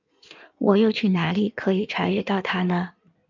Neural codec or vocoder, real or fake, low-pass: codec, 16 kHz in and 24 kHz out, 1.1 kbps, FireRedTTS-2 codec; fake; 7.2 kHz